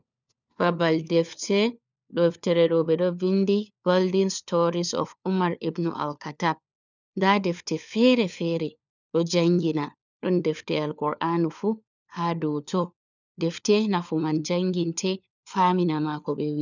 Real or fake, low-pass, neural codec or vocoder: fake; 7.2 kHz; codec, 16 kHz, 4 kbps, FunCodec, trained on LibriTTS, 50 frames a second